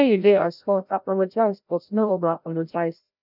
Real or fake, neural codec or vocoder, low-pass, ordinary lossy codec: fake; codec, 16 kHz, 0.5 kbps, FreqCodec, larger model; 5.4 kHz; none